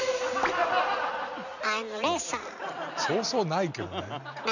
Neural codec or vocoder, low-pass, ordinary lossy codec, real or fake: none; 7.2 kHz; none; real